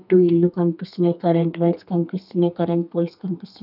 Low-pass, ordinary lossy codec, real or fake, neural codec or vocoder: 5.4 kHz; none; fake; codec, 32 kHz, 1.9 kbps, SNAC